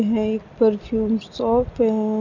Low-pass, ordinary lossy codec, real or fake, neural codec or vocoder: 7.2 kHz; none; real; none